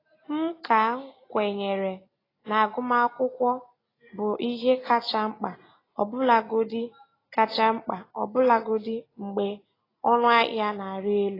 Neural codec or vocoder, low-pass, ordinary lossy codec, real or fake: none; 5.4 kHz; AAC, 24 kbps; real